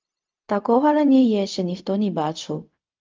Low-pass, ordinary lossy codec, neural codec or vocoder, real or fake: 7.2 kHz; Opus, 32 kbps; codec, 16 kHz, 0.4 kbps, LongCat-Audio-Codec; fake